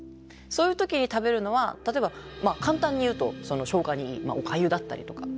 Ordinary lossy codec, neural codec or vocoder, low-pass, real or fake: none; none; none; real